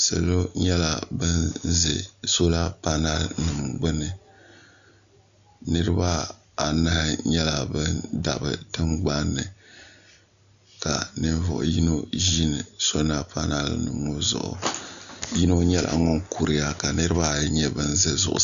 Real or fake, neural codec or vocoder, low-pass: real; none; 7.2 kHz